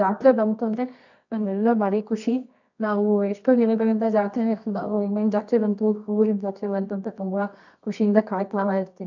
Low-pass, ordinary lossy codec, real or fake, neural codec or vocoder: 7.2 kHz; none; fake; codec, 24 kHz, 0.9 kbps, WavTokenizer, medium music audio release